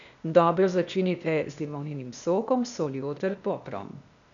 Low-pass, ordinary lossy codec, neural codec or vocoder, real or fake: 7.2 kHz; none; codec, 16 kHz, 0.8 kbps, ZipCodec; fake